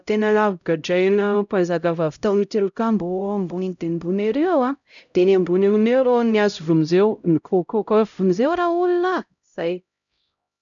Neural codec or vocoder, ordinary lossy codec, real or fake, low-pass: codec, 16 kHz, 0.5 kbps, X-Codec, HuBERT features, trained on LibriSpeech; none; fake; 7.2 kHz